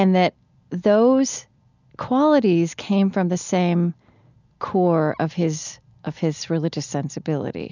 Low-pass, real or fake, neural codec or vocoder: 7.2 kHz; real; none